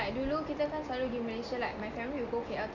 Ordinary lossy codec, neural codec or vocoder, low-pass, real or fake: none; none; 7.2 kHz; real